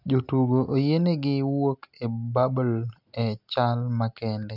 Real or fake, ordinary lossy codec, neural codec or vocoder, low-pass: real; none; none; 5.4 kHz